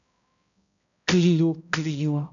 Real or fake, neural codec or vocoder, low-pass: fake; codec, 16 kHz, 0.5 kbps, X-Codec, HuBERT features, trained on balanced general audio; 7.2 kHz